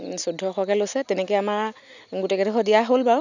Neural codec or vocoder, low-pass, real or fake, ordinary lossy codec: none; 7.2 kHz; real; none